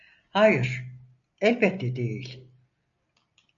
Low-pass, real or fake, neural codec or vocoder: 7.2 kHz; real; none